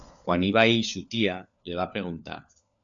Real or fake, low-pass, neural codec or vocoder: fake; 7.2 kHz; codec, 16 kHz, 4 kbps, FunCodec, trained on LibriTTS, 50 frames a second